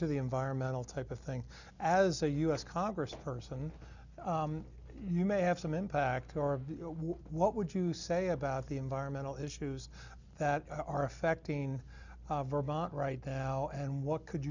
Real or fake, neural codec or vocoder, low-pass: real; none; 7.2 kHz